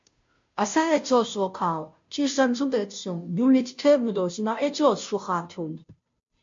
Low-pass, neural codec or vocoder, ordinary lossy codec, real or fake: 7.2 kHz; codec, 16 kHz, 0.5 kbps, FunCodec, trained on Chinese and English, 25 frames a second; MP3, 48 kbps; fake